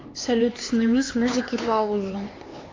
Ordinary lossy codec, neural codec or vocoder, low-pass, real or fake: AAC, 32 kbps; codec, 16 kHz, 4 kbps, X-Codec, HuBERT features, trained on LibriSpeech; 7.2 kHz; fake